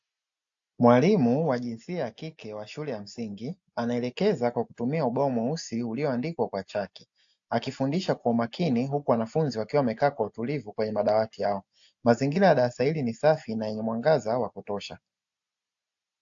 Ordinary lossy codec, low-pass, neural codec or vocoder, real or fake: AAC, 64 kbps; 7.2 kHz; none; real